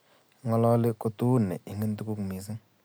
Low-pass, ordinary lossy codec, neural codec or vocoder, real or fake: none; none; none; real